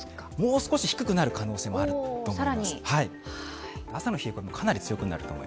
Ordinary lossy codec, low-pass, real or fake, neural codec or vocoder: none; none; real; none